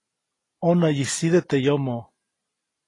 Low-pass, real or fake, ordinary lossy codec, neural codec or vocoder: 10.8 kHz; real; AAC, 32 kbps; none